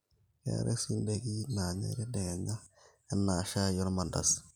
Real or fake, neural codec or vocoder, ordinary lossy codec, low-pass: real; none; none; none